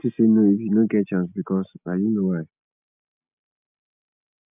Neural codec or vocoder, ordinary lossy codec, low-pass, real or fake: none; none; 3.6 kHz; real